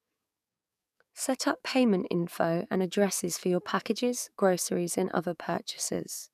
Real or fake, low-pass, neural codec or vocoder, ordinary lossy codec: fake; 14.4 kHz; codec, 44.1 kHz, 7.8 kbps, DAC; none